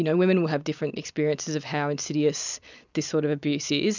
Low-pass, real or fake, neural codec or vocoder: 7.2 kHz; real; none